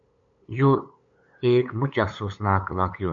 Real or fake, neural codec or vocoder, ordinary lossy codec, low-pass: fake; codec, 16 kHz, 8 kbps, FunCodec, trained on LibriTTS, 25 frames a second; MP3, 64 kbps; 7.2 kHz